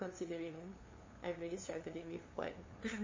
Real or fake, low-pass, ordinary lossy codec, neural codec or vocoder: fake; 7.2 kHz; MP3, 32 kbps; codec, 16 kHz, 2 kbps, FunCodec, trained on LibriTTS, 25 frames a second